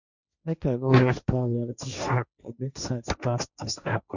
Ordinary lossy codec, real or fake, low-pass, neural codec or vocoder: none; fake; 7.2 kHz; codec, 16 kHz, 1.1 kbps, Voila-Tokenizer